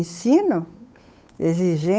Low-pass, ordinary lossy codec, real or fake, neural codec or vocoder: none; none; fake; codec, 16 kHz, 8 kbps, FunCodec, trained on Chinese and English, 25 frames a second